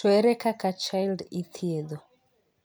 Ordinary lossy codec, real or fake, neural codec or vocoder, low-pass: none; real; none; none